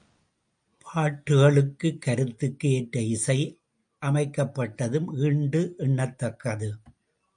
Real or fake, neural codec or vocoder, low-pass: real; none; 9.9 kHz